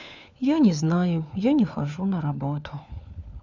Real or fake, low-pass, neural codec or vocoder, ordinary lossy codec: fake; 7.2 kHz; codec, 16 kHz, 4 kbps, FunCodec, trained on LibriTTS, 50 frames a second; none